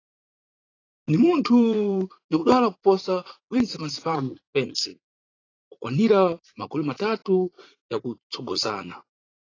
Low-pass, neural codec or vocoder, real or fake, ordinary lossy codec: 7.2 kHz; vocoder, 44.1 kHz, 80 mel bands, Vocos; fake; AAC, 32 kbps